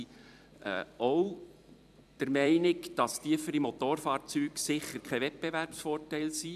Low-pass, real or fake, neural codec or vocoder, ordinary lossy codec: 14.4 kHz; fake; vocoder, 44.1 kHz, 128 mel bands every 256 samples, BigVGAN v2; none